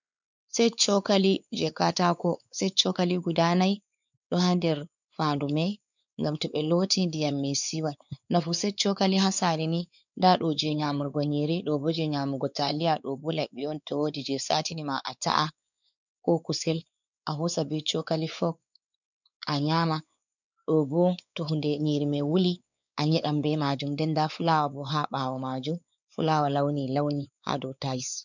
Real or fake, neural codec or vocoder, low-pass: fake; codec, 16 kHz, 4 kbps, X-Codec, WavLM features, trained on Multilingual LibriSpeech; 7.2 kHz